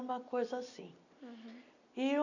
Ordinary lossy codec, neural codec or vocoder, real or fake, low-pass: none; none; real; 7.2 kHz